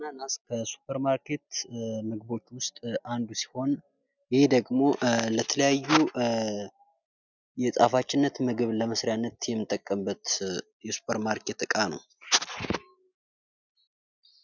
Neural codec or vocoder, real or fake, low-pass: none; real; 7.2 kHz